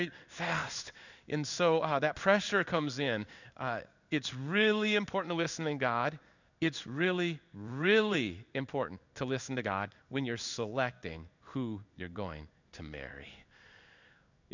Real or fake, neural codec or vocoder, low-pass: fake; codec, 16 kHz in and 24 kHz out, 1 kbps, XY-Tokenizer; 7.2 kHz